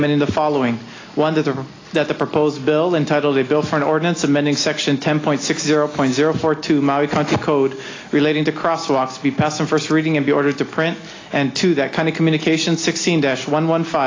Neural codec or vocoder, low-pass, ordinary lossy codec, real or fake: none; 7.2 kHz; AAC, 32 kbps; real